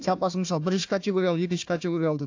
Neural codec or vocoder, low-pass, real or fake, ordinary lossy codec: codec, 16 kHz, 1 kbps, FunCodec, trained on Chinese and English, 50 frames a second; 7.2 kHz; fake; AAC, 48 kbps